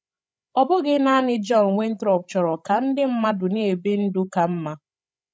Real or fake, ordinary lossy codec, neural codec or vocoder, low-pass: fake; none; codec, 16 kHz, 16 kbps, FreqCodec, larger model; none